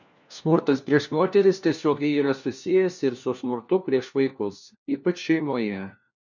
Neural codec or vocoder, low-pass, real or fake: codec, 16 kHz, 1 kbps, FunCodec, trained on LibriTTS, 50 frames a second; 7.2 kHz; fake